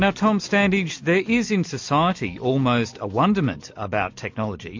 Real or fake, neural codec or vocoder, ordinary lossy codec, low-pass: real; none; MP3, 48 kbps; 7.2 kHz